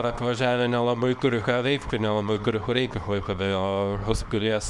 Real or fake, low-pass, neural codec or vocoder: fake; 10.8 kHz; codec, 24 kHz, 0.9 kbps, WavTokenizer, small release